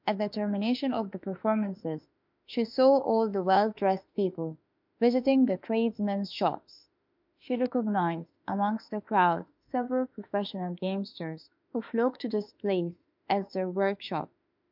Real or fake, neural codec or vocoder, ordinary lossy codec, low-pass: fake; autoencoder, 48 kHz, 32 numbers a frame, DAC-VAE, trained on Japanese speech; MP3, 48 kbps; 5.4 kHz